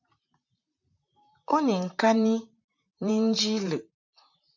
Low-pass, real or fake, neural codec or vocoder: 7.2 kHz; fake; vocoder, 44.1 kHz, 128 mel bands, Pupu-Vocoder